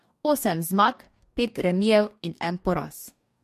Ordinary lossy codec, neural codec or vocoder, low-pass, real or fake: MP3, 64 kbps; codec, 44.1 kHz, 2.6 kbps, DAC; 14.4 kHz; fake